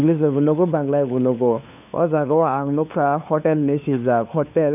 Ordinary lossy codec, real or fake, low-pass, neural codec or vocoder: none; fake; 3.6 kHz; codec, 16 kHz, 2 kbps, FunCodec, trained on LibriTTS, 25 frames a second